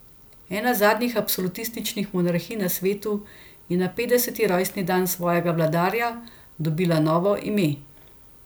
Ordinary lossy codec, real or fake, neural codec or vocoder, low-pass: none; real; none; none